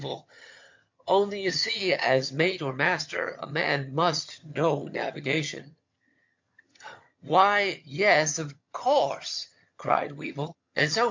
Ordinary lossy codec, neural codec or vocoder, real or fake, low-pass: MP3, 48 kbps; vocoder, 22.05 kHz, 80 mel bands, HiFi-GAN; fake; 7.2 kHz